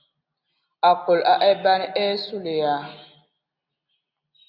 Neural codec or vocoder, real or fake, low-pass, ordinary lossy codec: none; real; 5.4 kHz; Opus, 64 kbps